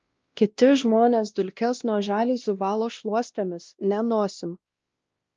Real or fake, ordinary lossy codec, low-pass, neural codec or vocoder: fake; Opus, 24 kbps; 7.2 kHz; codec, 16 kHz, 1 kbps, X-Codec, WavLM features, trained on Multilingual LibriSpeech